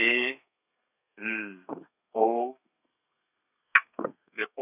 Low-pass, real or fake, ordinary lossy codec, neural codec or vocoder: 3.6 kHz; fake; none; codec, 32 kHz, 1.9 kbps, SNAC